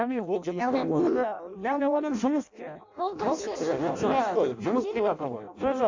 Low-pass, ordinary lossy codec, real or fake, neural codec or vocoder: 7.2 kHz; AAC, 48 kbps; fake; codec, 16 kHz in and 24 kHz out, 0.6 kbps, FireRedTTS-2 codec